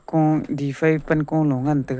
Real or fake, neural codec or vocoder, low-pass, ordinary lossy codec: real; none; none; none